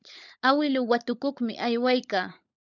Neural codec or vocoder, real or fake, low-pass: codec, 16 kHz, 16 kbps, FunCodec, trained on LibriTTS, 50 frames a second; fake; 7.2 kHz